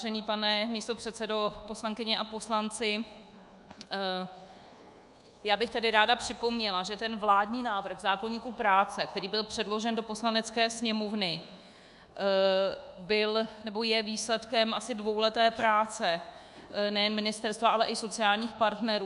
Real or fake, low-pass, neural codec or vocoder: fake; 10.8 kHz; codec, 24 kHz, 1.2 kbps, DualCodec